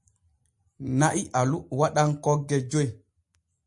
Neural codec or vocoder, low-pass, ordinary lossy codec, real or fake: none; 10.8 kHz; MP3, 48 kbps; real